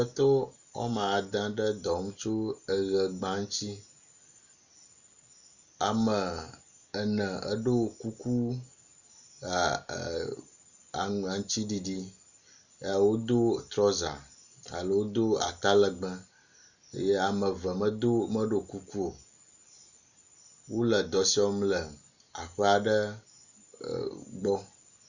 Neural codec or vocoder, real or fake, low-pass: none; real; 7.2 kHz